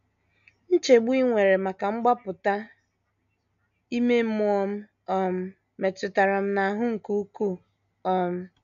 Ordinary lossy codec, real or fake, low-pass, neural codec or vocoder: none; real; 7.2 kHz; none